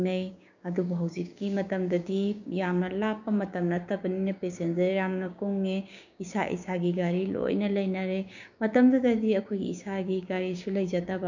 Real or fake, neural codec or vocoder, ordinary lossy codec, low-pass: fake; codec, 16 kHz, 6 kbps, DAC; none; 7.2 kHz